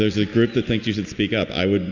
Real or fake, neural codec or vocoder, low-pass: real; none; 7.2 kHz